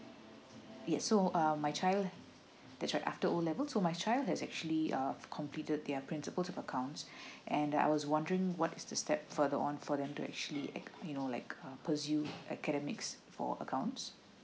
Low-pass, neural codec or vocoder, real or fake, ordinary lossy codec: none; none; real; none